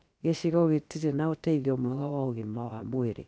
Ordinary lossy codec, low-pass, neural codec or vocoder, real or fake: none; none; codec, 16 kHz, 0.7 kbps, FocalCodec; fake